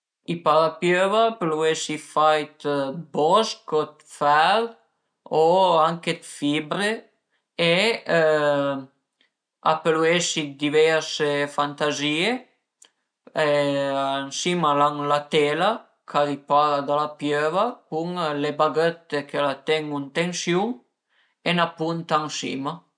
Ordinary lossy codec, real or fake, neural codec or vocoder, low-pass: none; real; none; 9.9 kHz